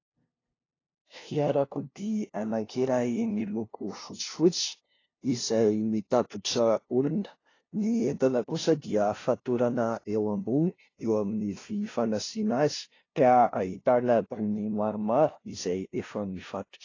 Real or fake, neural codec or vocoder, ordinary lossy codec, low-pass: fake; codec, 16 kHz, 0.5 kbps, FunCodec, trained on LibriTTS, 25 frames a second; AAC, 32 kbps; 7.2 kHz